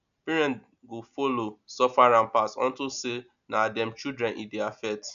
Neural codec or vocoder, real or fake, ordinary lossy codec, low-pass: none; real; none; 7.2 kHz